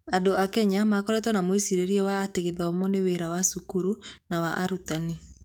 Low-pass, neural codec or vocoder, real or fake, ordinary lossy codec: 19.8 kHz; codec, 44.1 kHz, 7.8 kbps, DAC; fake; none